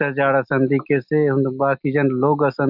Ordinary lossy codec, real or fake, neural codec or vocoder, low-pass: none; real; none; 5.4 kHz